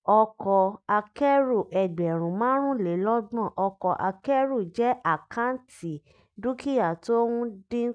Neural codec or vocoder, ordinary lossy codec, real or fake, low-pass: none; none; real; 9.9 kHz